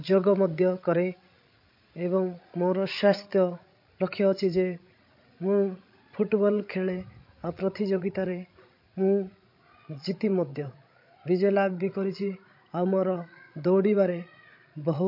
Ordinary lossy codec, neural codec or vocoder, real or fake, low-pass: MP3, 32 kbps; codec, 16 kHz, 16 kbps, FreqCodec, larger model; fake; 5.4 kHz